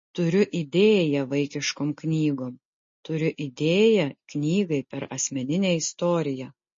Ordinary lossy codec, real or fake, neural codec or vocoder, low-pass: MP3, 32 kbps; real; none; 7.2 kHz